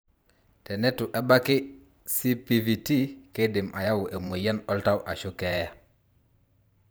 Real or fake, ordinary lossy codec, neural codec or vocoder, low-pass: fake; none; vocoder, 44.1 kHz, 128 mel bands every 512 samples, BigVGAN v2; none